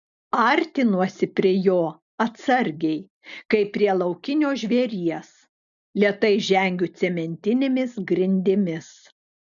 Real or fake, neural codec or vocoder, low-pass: real; none; 7.2 kHz